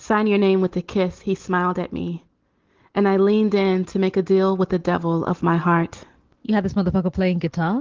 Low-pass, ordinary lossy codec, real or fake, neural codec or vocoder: 7.2 kHz; Opus, 16 kbps; real; none